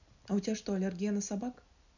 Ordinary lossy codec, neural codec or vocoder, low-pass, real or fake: none; none; 7.2 kHz; real